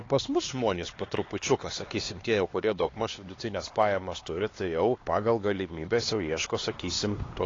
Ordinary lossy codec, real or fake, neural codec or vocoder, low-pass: AAC, 32 kbps; fake; codec, 16 kHz, 2 kbps, X-Codec, HuBERT features, trained on LibriSpeech; 7.2 kHz